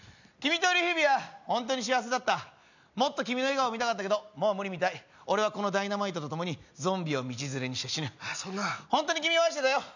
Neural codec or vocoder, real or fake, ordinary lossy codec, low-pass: none; real; none; 7.2 kHz